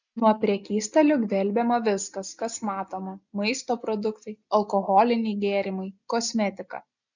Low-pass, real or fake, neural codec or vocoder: 7.2 kHz; real; none